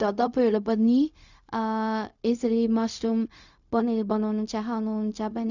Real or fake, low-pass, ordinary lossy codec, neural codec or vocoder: fake; 7.2 kHz; none; codec, 16 kHz, 0.4 kbps, LongCat-Audio-Codec